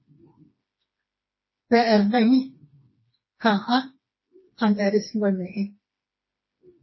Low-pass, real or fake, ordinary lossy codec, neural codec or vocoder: 7.2 kHz; fake; MP3, 24 kbps; codec, 16 kHz, 2 kbps, FreqCodec, smaller model